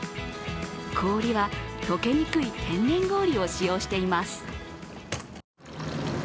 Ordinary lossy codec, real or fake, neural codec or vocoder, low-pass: none; real; none; none